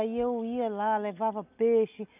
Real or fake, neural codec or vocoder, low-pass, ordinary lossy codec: real; none; 3.6 kHz; none